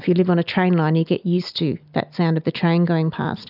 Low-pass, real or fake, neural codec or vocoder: 5.4 kHz; real; none